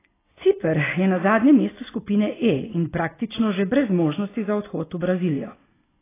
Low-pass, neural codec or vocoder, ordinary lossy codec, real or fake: 3.6 kHz; none; AAC, 16 kbps; real